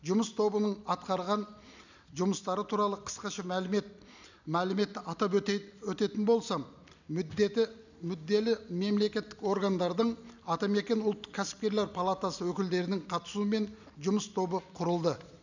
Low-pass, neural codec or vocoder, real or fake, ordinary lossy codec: 7.2 kHz; none; real; none